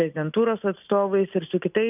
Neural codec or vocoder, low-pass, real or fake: none; 3.6 kHz; real